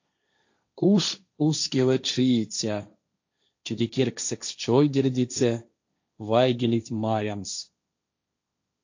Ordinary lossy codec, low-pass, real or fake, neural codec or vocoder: AAC, 48 kbps; 7.2 kHz; fake; codec, 16 kHz, 1.1 kbps, Voila-Tokenizer